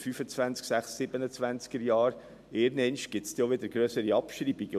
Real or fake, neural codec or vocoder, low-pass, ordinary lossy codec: real; none; 14.4 kHz; MP3, 96 kbps